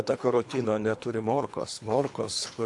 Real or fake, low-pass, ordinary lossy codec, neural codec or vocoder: fake; 10.8 kHz; AAC, 64 kbps; codec, 24 kHz, 3 kbps, HILCodec